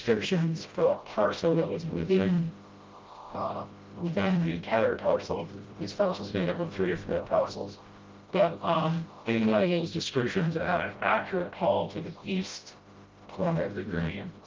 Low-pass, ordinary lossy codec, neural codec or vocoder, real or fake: 7.2 kHz; Opus, 24 kbps; codec, 16 kHz, 0.5 kbps, FreqCodec, smaller model; fake